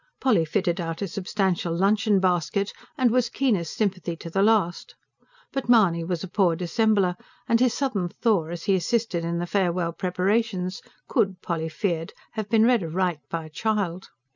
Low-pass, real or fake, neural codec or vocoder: 7.2 kHz; real; none